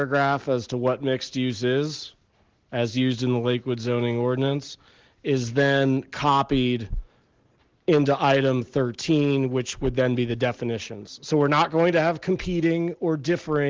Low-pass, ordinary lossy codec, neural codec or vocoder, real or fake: 7.2 kHz; Opus, 16 kbps; none; real